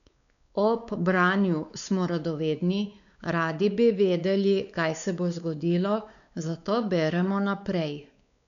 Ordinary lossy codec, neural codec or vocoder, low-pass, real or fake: none; codec, 16 kHz, 4 kbps, X-Codec, WavLM features, trained on Multilingual LibriSpeech; 7.2 kHz; fake